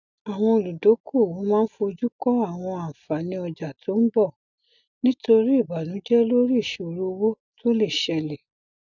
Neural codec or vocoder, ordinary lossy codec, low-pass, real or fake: none; none; 7.2 kHz; real